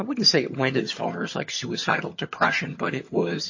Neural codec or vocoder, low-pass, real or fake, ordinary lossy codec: vocoder, 22.05 kHz, 80 mel bands, HiFi-GAN; 7.2 kHz; fake; MP3, 32 kbps